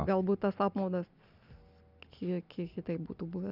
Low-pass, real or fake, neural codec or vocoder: 5.4 kHz; real; none